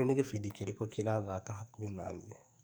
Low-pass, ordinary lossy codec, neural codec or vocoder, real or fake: none; none; codec, 44.1 kHz, 2.6 kbps, SNAC; fake